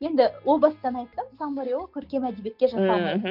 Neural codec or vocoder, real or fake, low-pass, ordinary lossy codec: none; real; 5.4 kHz; none